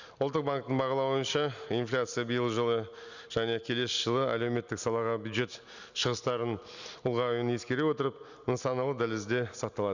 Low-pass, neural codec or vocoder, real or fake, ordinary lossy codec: 7.2 kHz; none; real; none